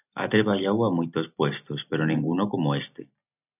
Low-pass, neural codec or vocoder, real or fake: 3.6 kHz; none; real